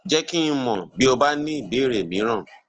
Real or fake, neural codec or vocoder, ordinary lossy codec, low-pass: real; none; Opus, 16 kbps; 7.2 kHz